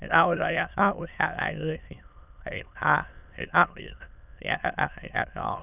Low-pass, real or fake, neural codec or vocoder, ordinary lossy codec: 3.6 kHz; fake; autoencoder, 22.05 kHz, a latent of 192 numbers a frame, VITS, trained on many speakers; none